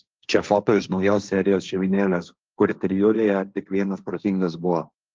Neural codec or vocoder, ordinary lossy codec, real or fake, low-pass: codec, 16 kHz, 1.1 kbps, Voila-Tokenizer; Opus, 24 kbps; fake; 7.2 kHz